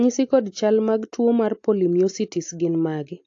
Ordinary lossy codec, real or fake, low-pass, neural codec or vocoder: AAC, 48 kbps; real; 7.2 kHz; none